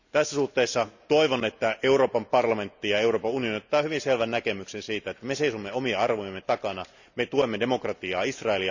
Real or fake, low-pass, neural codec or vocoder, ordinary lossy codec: real; 7.2 kHz; none; none